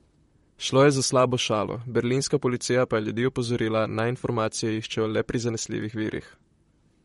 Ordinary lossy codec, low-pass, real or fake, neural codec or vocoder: MP3, 48 kbps; 19.8 kHz; fake; vocoder, 44.1 kHz, 128 mel bands, Pupu-Vocoder